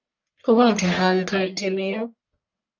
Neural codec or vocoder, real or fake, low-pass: codec, 44.1 kHz, 1.7 kbps, Pupu-Codec; fake; 7.2 kHz